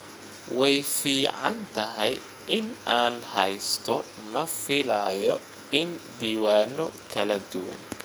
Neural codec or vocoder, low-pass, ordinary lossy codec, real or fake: codec, 44.1 kHz, 2.6 kbps, SNAC; none; none; fake